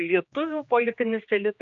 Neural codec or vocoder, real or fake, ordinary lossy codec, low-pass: codec, 16 kHz, 2 kbps, X-Codec, HuBERT features, trained on general audio; fake; AAC, 64 kbps; 7.2 kHz